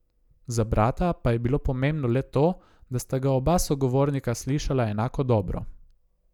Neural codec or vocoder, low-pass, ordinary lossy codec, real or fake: none; 19.8 kHz; none; real